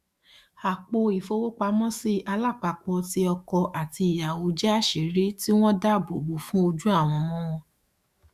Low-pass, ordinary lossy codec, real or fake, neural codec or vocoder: 14.4 kHz; Opus, 64 kbps; fake; autoencoder, 48 kHz, 128 numbers a frame, DAC-VAE, trained on Japanese speech